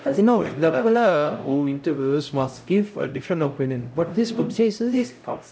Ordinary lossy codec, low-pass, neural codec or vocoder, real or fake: none; none; codec, 16 kHz, 0.5 kbps, X-Codec, HuBERT features, trained on LibriSpeech; fake